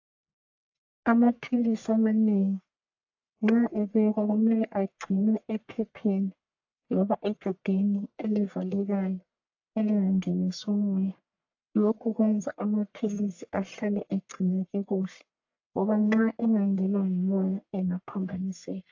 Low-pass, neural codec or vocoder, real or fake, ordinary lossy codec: 7.2 kHz; codec, 44.1 kHz, 1.7 kbps, Pupu-Codec; fake; AAC, 48 kbps